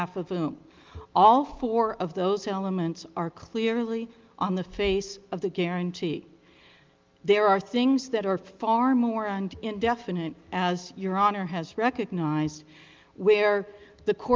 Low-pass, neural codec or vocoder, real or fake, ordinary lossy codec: 7.2 kHz; none; real; Opus, 32 kbps